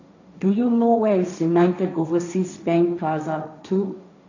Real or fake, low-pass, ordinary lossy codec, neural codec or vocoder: fake; 7.2 kHz; none; codec, 16 kHz, 1.1 kbps, Voila-Tokenizer